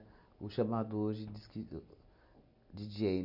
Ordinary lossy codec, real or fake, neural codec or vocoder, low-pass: none; real; none; 5.4 kHz